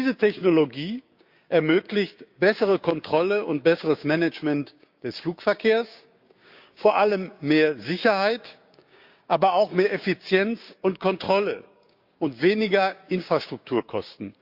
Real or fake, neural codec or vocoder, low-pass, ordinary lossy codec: fake; codec, 16 kHz, 6 kbps, DAC; 5.4 kHz; Opus, 64 kbps